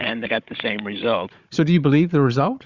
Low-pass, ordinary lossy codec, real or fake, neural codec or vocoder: 7.2 kHz; Opus, 64 kbps; fake; codec, 16 kHz, 16 kbps, FunCodec, trained on Chinese and English, 50 frames a second